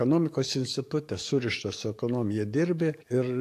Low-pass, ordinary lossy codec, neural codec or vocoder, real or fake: 14.4 kHz; AAC, 64 kbps; codec, 44.1 kHz, 7.8 kbps, DAC; fake